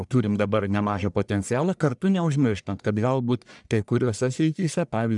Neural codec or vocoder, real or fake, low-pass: codec, 44.1 kHz, 1.7 kbps, Pupu-Codec; fake; 10.8 kHz